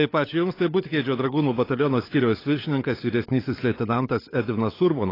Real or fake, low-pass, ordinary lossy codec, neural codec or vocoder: fake; 5.4 kHz; AAC, 24 kbps; codec, 16 kHz, 16 kbps, FunCodec, trained on LibriTTS, 50 frames a second